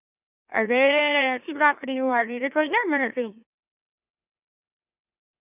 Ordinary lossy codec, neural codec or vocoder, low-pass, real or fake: none; autoencoder, 44.1 kHz, a latent of 192 numbers a frame, MeloTTS; 3.6 kHz; fake